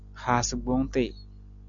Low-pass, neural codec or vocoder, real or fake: 7.2 kHz; none; real